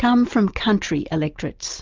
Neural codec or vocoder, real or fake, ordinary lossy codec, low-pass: none; real; Opus, 32 kbps; 7.2 kHz